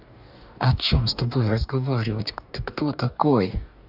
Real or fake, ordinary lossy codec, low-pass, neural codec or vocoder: fake; none; 5.4 kHz; codec, 44.1 kHz, 2.6 kbps, DAC